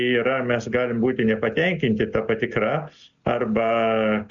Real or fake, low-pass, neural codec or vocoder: real; 7.2 kHz; none